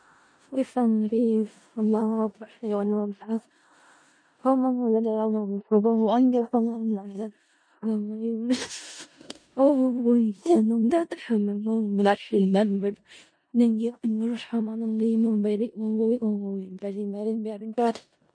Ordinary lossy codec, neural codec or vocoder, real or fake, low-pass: MP3, 48 kbps; codec, 16 kHz in and 24 kHz out, 0.4 kbps, LongCat-Audio-Codec, four codebook decoder; fake; 9.9 kHz